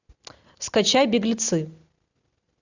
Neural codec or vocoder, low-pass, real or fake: none; 7.2 kHz; real